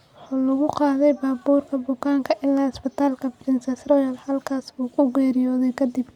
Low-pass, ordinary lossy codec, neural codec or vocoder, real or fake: 19.8 kHz; Opus, 64 kbps; none; real